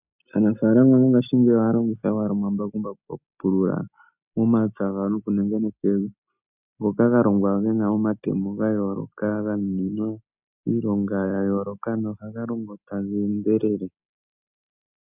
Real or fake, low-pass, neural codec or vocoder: real; 3.6 kHz; none